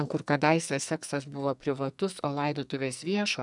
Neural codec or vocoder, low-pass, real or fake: codec, 44.1 kHz, 2.6 kbps, SNAC; 10.8 kHz; fake